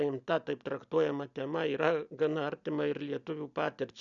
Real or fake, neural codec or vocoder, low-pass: real; none; 7.2 kHz